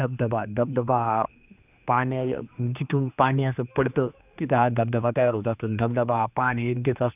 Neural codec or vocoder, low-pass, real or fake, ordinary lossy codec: codec, 16 kHz, 2 kbps, X-Codec, HuBERT features, trained on general audio; 3.6 kHz; fake; none